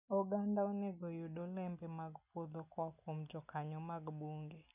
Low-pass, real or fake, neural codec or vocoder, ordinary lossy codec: 3.6 kHz; real; none; none